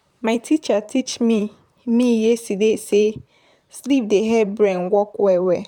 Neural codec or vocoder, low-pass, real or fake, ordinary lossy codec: vocoder, 44.1 kHz, 128 mel bands, Pupu-Vocoder; 19.8 kHz; fake; none